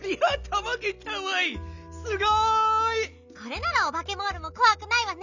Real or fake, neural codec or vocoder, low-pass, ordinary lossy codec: real; none; 7.2 kHz; none